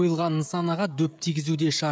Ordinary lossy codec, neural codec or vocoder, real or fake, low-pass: none; codec, 16 kHz, 16 kbps, FreqCodec, smaller model; fake; none